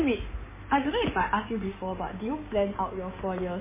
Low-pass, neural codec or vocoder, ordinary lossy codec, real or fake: 3.6 kHz; codec, 16 kHz in and 24 kHz out, 2.2 kbps, FireRedTTS-2 codec; MP3, 16 kbps; fake